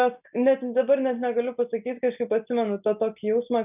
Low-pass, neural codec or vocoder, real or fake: 3.6 kHz; none; real